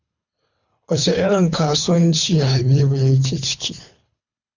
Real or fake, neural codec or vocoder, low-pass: fake; codec, 24 kHz, 3 kbps, HILCodec; 7.2 kHz